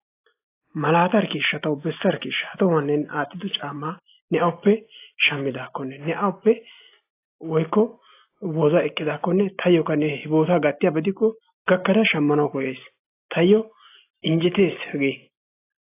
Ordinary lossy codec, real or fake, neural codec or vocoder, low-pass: AAC, 24 kbps; real; none; 3.6 kHz